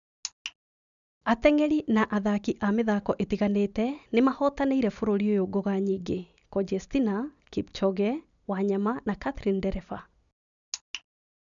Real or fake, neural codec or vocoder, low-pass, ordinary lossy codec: real; none; 7.2 kHz; none